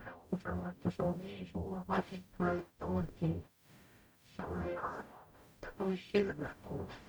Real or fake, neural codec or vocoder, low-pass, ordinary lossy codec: fake; codec, 44.1 kHz, 0.9 kbps, DAC; none; none